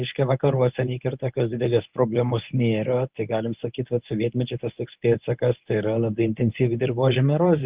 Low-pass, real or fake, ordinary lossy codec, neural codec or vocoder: 3.6 kHz; fake; Opus, 32 kbps; codec, 24 kHz, 0.9 kbps, WavTokenizer, medium speech release version 1